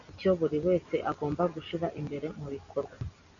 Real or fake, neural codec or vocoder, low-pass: real; none; 7.2 kHz